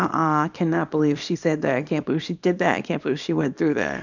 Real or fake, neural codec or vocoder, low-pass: fake; codec, 24 kHz, 0.9 kbps, WavTokenizer, small release; 7.2 kHz